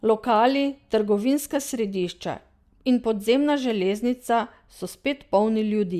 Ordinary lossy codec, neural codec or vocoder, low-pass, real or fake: Opus, 64 kbps; none; 14.4 kHz; real